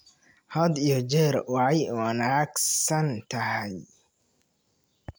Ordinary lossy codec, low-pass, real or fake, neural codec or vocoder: none; none; real; none